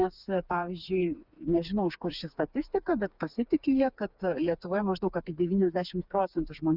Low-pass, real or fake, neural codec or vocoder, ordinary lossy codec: 5.4 kHz; fake; codec, 16 kHz, 4 kbps, FreqCodec, smaller model; Opus, 64 kbps